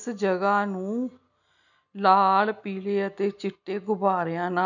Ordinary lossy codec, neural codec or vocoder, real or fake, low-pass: none; none; real; 7.2 kHz